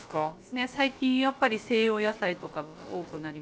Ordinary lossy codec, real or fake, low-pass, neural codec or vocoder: none; fake; none; codec, 16 kHz, about 1 kbps, DyCAST, with the encoder's durations